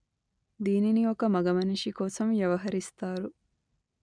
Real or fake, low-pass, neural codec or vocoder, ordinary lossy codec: real; 9.9 kHz; none; none